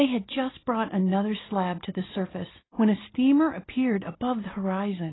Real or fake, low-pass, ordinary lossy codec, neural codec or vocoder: real; 7.2 kHz; AAC, 16 kbps; none